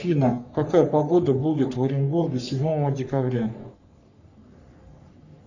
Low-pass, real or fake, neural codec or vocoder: 7.2 kHz; fake; codec, 44.1 kHz, 3.4 kbps, Pupu-Codec